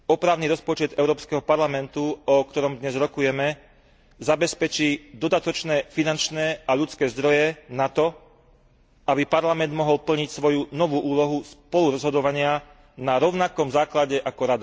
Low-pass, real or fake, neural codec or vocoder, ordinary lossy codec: none; real; none; none